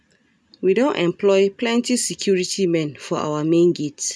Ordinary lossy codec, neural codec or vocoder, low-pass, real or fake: none; none; none; real